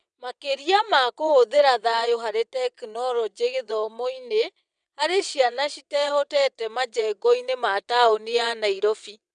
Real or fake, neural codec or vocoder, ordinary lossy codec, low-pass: fake; vocoder, 22.05 kHz, 80 mel bands, WaveNeXt; none; 9.9 kHz